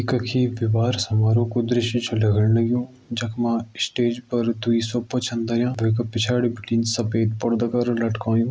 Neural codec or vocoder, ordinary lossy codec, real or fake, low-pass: none; none; real; none